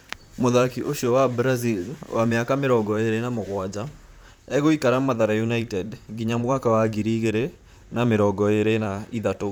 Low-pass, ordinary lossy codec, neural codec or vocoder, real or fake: none; none; vocoder, 44.1 kHz, 128 mel bands, Pupu-Vocoder; fake